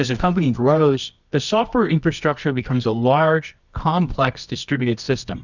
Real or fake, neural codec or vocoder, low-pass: fake; codec, 24 kHz, 0.9 kbps, WavTokenizer, medium music audio release; 7.2 kHz